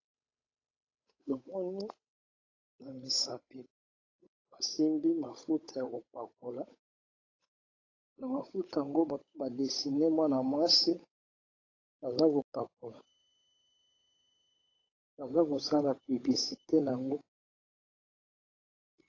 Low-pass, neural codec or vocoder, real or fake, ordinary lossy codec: 7.2 kHz; codec, 16 kHz, 8 kbps, FunCodec, trained on Chinese and English, 25 frames a second; fake; AAC, 32 kbps